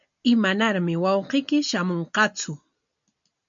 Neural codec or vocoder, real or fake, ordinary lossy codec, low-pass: none; real; MP3, 64 kbps; 7.2 kHz